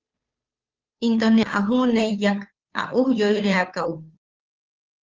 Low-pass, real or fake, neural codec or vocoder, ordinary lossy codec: 7.2 kHz; fake; codec, 16 kHz, 2 kbps, FunCodec, trained on Chinese and English, 25 frames a second; Opus, 24 kbps